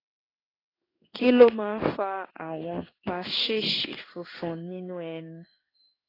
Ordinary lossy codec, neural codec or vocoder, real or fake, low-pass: AAC, 24 kbps; codec, 16 kHz in and 24 kHz out, 1 kbps, XY-Tokenizer; fake; 5.4 kHz